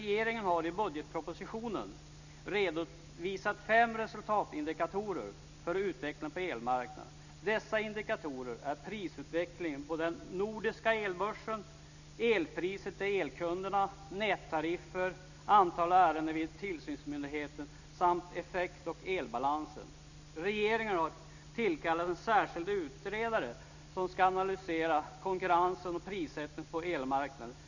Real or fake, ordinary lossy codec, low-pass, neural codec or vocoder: real; none; 7.2 kHz; none